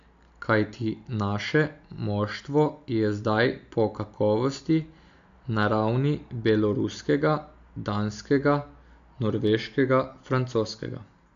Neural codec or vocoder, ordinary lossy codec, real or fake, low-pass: none; AAC, 64 kbps; real; 7.2 kHz